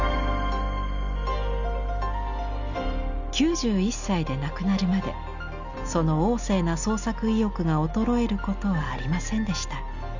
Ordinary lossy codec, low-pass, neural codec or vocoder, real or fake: Opus, 64 kbps; 7.2 kHz; none; real